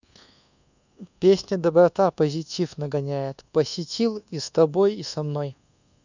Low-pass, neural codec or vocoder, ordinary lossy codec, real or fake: 7.2 kHz; codec, 24 kHz, 1.2 kbps, DualCodec; none; fake